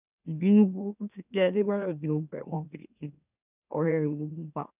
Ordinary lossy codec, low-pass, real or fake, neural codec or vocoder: none; 3.6 kHz; fake; autoencoder, 44.1 kHz, a latent of 192 numbers a frame, MeloTTS